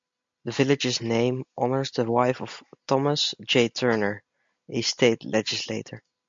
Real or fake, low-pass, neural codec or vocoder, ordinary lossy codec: real; 7.2 kHz; none; MP3, 48 kbps